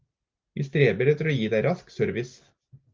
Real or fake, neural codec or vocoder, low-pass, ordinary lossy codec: real; none; 7.2 kHz; Opus, 32 kbps